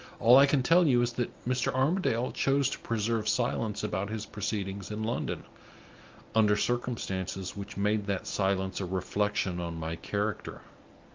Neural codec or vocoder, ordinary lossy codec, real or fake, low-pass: none; Opus, 24 kbps; real; 7.2 kHz